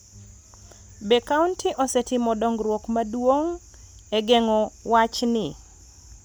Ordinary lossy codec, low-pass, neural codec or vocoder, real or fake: none; none; none; real